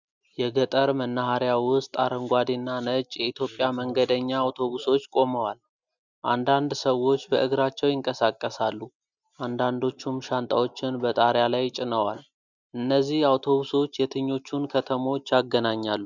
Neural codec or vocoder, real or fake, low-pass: none; real; 7.2 kHz